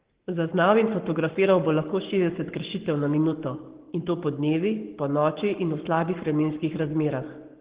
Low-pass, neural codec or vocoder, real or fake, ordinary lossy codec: 3.6 kHz; codec, 44.1 kHz, 7.8 kbps, Pupu-Codec; fake; Opus, 16 kbps